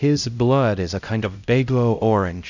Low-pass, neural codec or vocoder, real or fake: 7.2 kHz; codec, 16 kHz, 0.5 kbps, X-Codec, HuBERT features, trained on LibriSpeech; fake